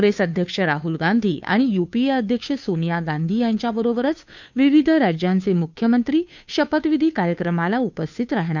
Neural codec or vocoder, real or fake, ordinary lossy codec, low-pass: codec, 16 kHz, 2 kbps, FunCodec, trained on Chinese and English, 25 frames a second; fake; none; 7.2 kHz